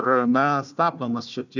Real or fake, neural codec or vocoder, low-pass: fake; codec, 16 kHz, 1 kbps, FunCodec, trained on Chinese and English, 50 frames a second; 7.2 kHz